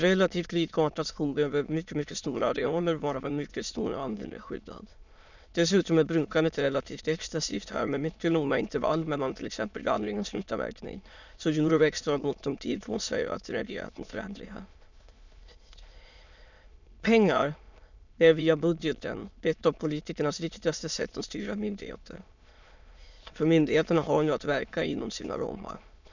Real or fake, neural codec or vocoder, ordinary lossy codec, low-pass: fake; autoencoder, 22.05 kHz, a latent of 192 numbers a frame, VITS, trained on many speakers; none; 7.2 kHz